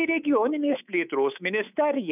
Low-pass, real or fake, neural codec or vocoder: 3.6 kHz; fake; codec, 24 kHz, 3.1 kbps, DualCodec